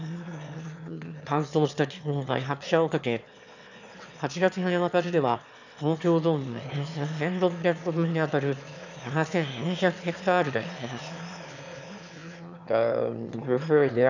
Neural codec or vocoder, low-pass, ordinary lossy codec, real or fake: autoencoder, 22.05 kHz, a latent of 192 numbers a frame, VITS, trained on one speaker; 7.2 kHz; none; fake